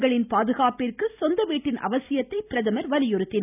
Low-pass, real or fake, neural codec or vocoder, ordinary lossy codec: 3.6 kHz; real; none; none